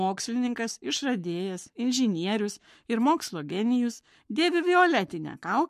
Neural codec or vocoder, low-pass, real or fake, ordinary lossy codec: codec, 44.1 kHz, 7.8 kbps, Pupu-Codec; 14.4 kHz; fake; MP3, 64 kbps